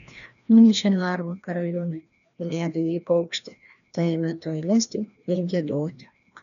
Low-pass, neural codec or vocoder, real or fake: 7.2 kHz; codec, 16 kHz, 1 kbps, FreqCodec, larger model; fake